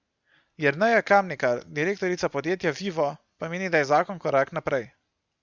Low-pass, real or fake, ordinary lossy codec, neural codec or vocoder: 7.2 kHz; real; Opus, 64 kbps; none